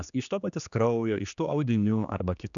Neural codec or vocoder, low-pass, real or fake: codec, 16 kHz, 2 kbps, X-Codec, HuBERT features, trained on general audio; 7.2 kHz; fake